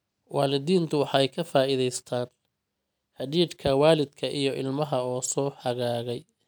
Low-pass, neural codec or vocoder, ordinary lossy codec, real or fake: none; none; none; real